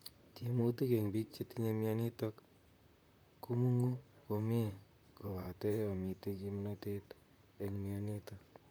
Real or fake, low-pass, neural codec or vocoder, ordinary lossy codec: fake; none; vocoder, 44.1 kHz, 128 mel bands, Pupu-Vocoder; none